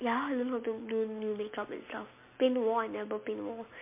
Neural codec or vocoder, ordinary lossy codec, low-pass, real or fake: none; MP3, 24 kbps; 3.6 kHz; real